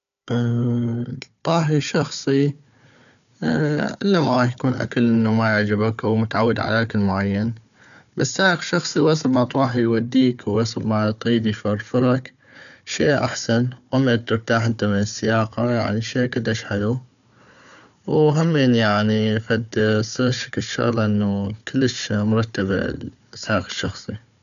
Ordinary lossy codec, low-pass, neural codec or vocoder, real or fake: MP3, 96 kbps; 7.2 kHz; codec, 16 kHz, 4 kbps, FunCodec, trained on Chinese and English, 50 frames a second; fake